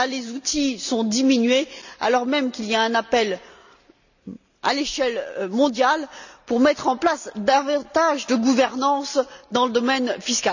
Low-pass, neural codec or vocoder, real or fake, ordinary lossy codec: 7.2 kHz; none; real; none